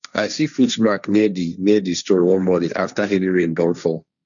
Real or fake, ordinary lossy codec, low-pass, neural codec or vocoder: fake; none; 7.2 kHz; codec, 16 kHz, 1.1 kbps, Voila-Tokenizer